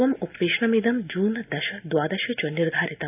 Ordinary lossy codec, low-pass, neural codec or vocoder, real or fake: none; 3.6 kHz; none; real